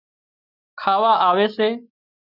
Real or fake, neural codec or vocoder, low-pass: real; none; 5.4 kHz